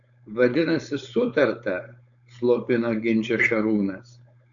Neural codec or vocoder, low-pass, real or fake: codec, 16 kHz, 4.8 kbps, FACodec; 7.2 kHz; fake